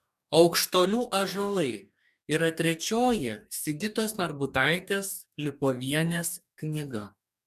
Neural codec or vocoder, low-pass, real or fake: codec, 44.1 kHz, 2.6 kbps, DAC; 14.4 kHz; fake